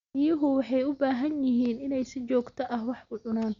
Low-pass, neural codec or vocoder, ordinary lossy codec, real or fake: 7.2 kHz; none; Opus, 64 kbps; real